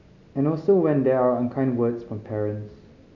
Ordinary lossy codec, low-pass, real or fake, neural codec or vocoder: none; 7.2 kHz; real; none